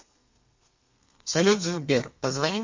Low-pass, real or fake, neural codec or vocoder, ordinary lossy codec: 7.2 kHz; fake; codec, 32 kHz, 1.9 kbps, SNAC; MP3, 48 kbps